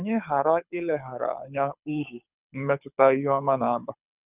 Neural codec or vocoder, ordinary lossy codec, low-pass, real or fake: codec, 24 kHz, 6 kbps, HILCodec; none; 3.6 kHz; fake